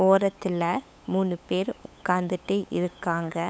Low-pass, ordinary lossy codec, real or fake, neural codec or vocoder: none; none; fake; codec, 16 kHz, 8 kbps, FunCodec, trained on LibriTTS, 25 frames a second